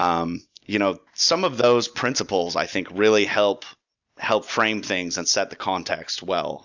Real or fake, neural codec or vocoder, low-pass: real; none; 7.2 kHz